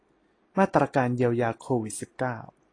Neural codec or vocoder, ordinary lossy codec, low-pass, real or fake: none; AAC, 32 kbps; 9.9 kHz; real